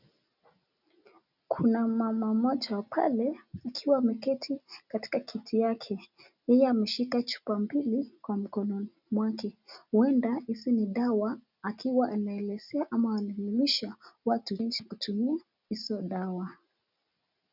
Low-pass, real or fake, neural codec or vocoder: 5.4 kHz; real; none